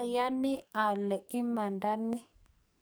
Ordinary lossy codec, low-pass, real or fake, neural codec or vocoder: none; none; fake; codec, 44.1 kHz, 2.6 kbps, SNAC